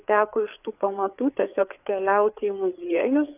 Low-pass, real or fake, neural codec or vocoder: 3.6 kHz; fake; codec, 16 kHz, 4 kbps, FreqCodec, larger model